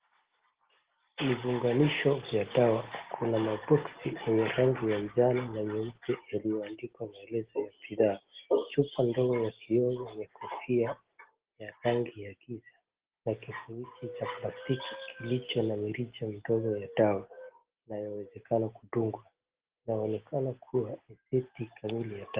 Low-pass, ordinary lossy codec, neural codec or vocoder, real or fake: 3.6 kHz; Opus, 16 kbps; none; real